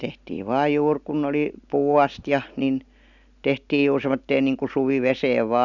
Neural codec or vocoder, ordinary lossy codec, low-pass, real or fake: none; none; 7.2 kHz; real